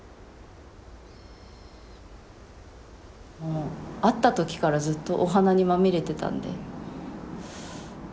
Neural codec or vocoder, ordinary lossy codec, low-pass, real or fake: none; none; none; real